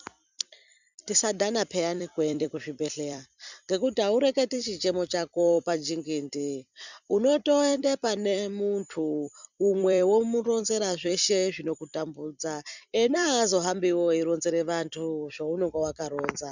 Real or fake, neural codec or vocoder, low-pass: fake; vocoder, 44.1 kHz, 128 mel bands every 256 samples, BigVGAN v2; 7.2 kHz